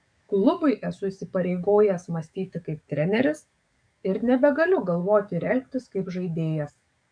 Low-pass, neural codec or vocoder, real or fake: 9.9 kHz; codec, 44.1 kHz, 7.8 kbps, DAC; fake